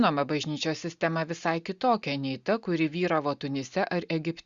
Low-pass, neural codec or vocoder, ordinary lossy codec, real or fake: 7.2 kHz; none; Opus, 64 kbps; real